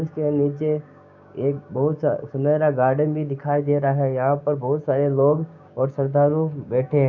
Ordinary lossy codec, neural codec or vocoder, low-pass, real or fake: none; codec, 16 kHz, 6 kbps, DAC; 7.2 kHz; fake